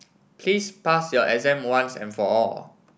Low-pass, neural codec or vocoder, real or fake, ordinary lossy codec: none; none; real; none